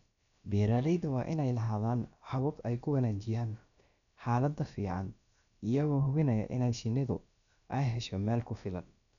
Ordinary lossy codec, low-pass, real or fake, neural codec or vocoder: none; 7.2 kHz; fake; codec, 16 kHz, about 1 kbps, DyCAST, with the encoder's durations